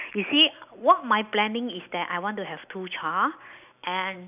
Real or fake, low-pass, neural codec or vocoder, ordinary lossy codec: real; 3.6 kHz; none; none